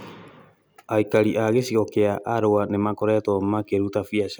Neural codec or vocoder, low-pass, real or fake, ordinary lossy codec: none; none; real; none